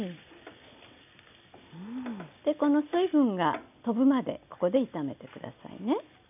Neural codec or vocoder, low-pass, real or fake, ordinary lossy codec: none; 3.6 kHz; real; none